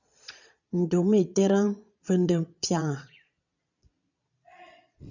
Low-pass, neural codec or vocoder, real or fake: 7.2 kHz; vocoder, 22.05 kHz, 80 mel bands, Vocos; fake